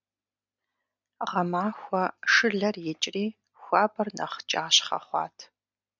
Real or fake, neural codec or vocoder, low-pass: real; none; 7.2 kHz